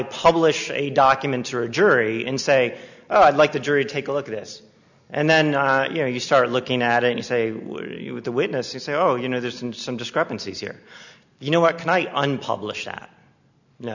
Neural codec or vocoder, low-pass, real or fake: none; 7.2 kHz; real